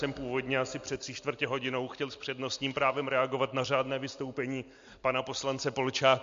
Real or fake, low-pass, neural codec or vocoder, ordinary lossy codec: real; 7.2 kHz; none; MP3, 48 kbps